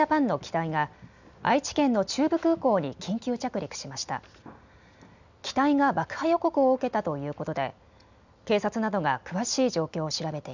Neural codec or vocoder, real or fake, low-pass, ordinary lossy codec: none; real; 7.2 kHz; none